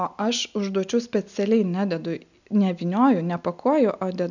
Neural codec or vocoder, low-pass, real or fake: none; 7.2 kHz; real